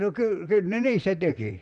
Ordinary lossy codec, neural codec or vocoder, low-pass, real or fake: none; vocoder, 44.1 kHz, 128 mel bands, Pupu-Vocoder; 10.8 kHz; fake